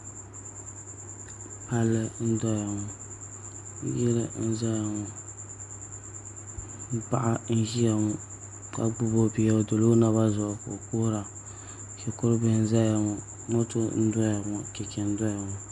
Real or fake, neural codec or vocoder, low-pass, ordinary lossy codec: real; none; 10.8 kHz; Opus, 64 kbps